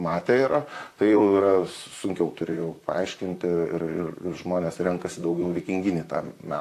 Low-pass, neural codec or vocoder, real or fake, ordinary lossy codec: 14.4 kHz; vocoder, 44.1 kHz, 128 mel bands, Pupu-Vocoder; fake; AAC, 64 kbps